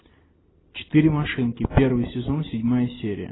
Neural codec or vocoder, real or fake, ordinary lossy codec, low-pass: none; real; AAC, 16 kbps; 7.2 kHz